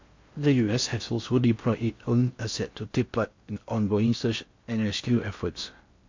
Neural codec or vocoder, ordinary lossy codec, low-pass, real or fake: codec, 16 kHz in and 24 kHz out, 0.6 kbps, FocalCodec, streaming, 4096 codes; MP3, 48 kbps; 7.2 kHz; fake